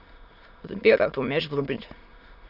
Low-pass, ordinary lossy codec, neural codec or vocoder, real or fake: 5.4 kHz; none; autoencoder, 22.05 kHz, a latent of 192 numbers a frame, VITS, trained on many speakers; fake